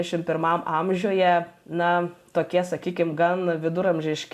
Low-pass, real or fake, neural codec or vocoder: 14.4 kHz; real; none